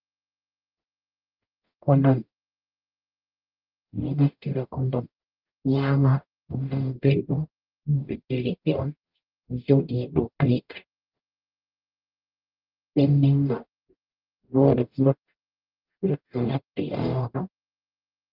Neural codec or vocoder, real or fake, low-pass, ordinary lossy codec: codec, 44.1 kHz, 0.9 kbps, DAC; fake; 5.4 kHz; Opus, 24 kbps